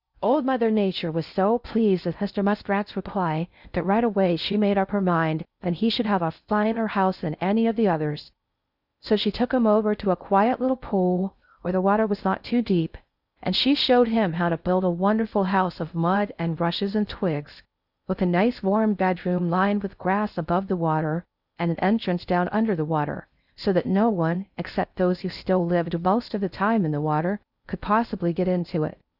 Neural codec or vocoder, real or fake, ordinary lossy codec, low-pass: codec, 16 kHz in and 24 kHz out, 0.6 kbps, FocalCodec, streaming, 4096 codes; fake; Opus, 64 kbps; 5.4 kHz